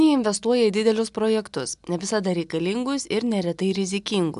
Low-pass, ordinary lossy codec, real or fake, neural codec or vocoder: 10.8 kHz; AAC, 96 kbps; real; none